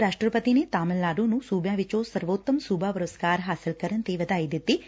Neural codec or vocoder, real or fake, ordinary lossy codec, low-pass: none; real; none; none